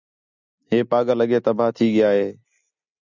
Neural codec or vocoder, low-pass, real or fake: none; 7.2 kHz; real